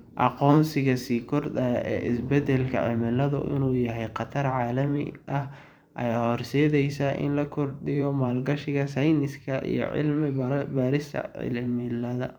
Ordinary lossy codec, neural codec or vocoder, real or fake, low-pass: none; vocoder, 44.1 kHz, 128 mel bands every 512 samples, BigVGAN v2; fake; 19.8 kHz